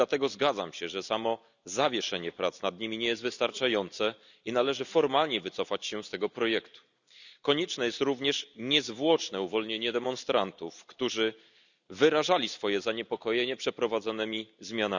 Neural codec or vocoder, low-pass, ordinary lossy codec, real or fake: none; 7.2 kHz; none; real